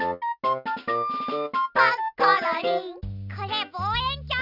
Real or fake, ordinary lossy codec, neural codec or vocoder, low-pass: real; none; none; 5.4 kHz